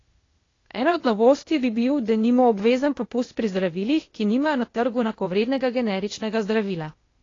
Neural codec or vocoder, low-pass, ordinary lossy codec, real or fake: codec, 16 kHz, 0.8 kbps, ZipCodec; 7.2 kHz; AAC, 32 kbps; fake